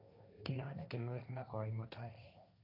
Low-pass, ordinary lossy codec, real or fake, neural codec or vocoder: 5.4 kHz; none; fake; codec, 16 kHz, 1.1 kbps, Voila-Tokenizer